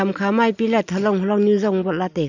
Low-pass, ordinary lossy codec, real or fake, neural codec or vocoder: 7.2 kHz; none; real; none